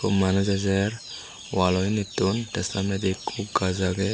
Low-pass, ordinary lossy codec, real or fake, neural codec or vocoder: none; none; real; none